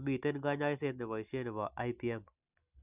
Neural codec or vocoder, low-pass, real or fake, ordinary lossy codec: none; 3.6 kHz; real; none